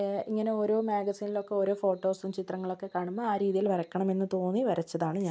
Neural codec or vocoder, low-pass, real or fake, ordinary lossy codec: none; none; real; none